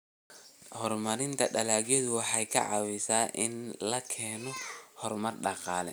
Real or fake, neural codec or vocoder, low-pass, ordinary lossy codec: real; none; none; none